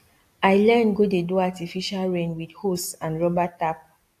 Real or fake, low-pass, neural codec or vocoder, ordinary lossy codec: real; 14.4 kHz; none; AAC, 64 kbps